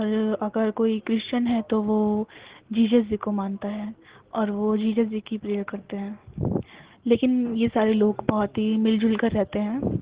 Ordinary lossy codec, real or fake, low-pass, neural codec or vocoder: Opus, 16 kbps; real; 3.6 kHz; none